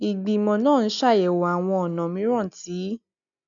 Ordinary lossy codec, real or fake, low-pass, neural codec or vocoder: MP3, 96 kbps; real; 7.2 kHz; none